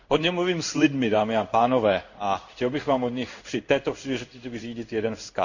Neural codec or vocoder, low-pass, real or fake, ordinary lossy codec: codec, 16 kHz in and 24 kHz out, 1 kbps, XY-Tokenizer; 7.2 kHz; fake; none